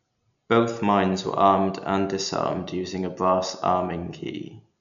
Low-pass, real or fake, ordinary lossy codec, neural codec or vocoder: 7.2 kHz; real; none; none